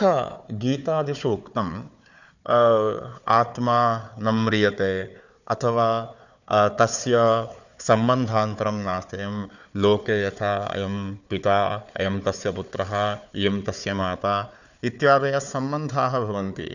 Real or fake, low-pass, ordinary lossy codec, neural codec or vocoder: fake; 7.2 kHz; none; codec, 16 kHz, 4 kbps, FunCodec, trained on Chinese and English, 50 frames a second